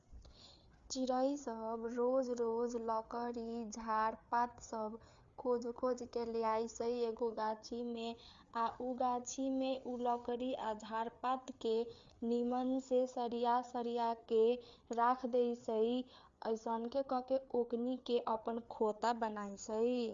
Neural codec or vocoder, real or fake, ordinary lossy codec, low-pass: codec, 16 kHz, 4 kbps, FreqCodec, larger model; fake; Opus, 64 kbps; 7.2 kHz